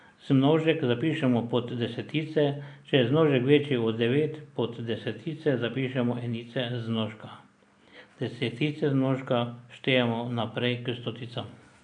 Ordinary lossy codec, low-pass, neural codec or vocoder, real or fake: none; 9.9 kHz; none; real